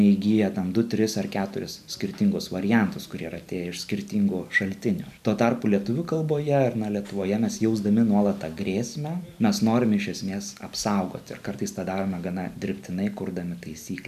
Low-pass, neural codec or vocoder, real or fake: 14.4 kHz; none; real